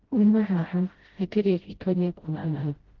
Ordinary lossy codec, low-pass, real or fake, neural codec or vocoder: Opus, 16 kbps; 7.2 kHz; fake; codec, 16 kHz, 0.5 kbps, FreqCodec, smaller model